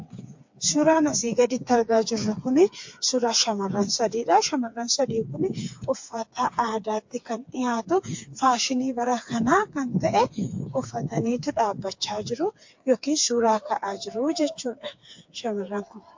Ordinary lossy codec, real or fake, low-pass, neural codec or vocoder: MP3, 48 kbps; fake; 7.2 kHz; codec, 16 kHz, 4 kbps, FreqCodec, smaller model